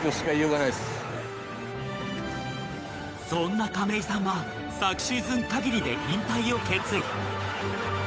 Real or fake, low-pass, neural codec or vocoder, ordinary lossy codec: fake; none; codec, 16 kHz, 8 kbps, FunCodec, trained on Chinese and English, 25 frames a second; none